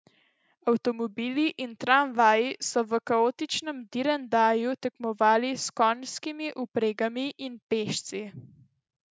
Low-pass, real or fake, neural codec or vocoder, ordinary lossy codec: none; real; none; none